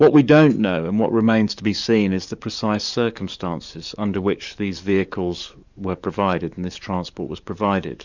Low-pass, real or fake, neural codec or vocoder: 7.2 kHz; fake; codec, 44.1 kHz, 7.8 kbps, DAC